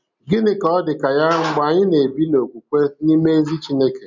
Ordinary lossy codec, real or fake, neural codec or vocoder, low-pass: none; real; none; 7.2 kHz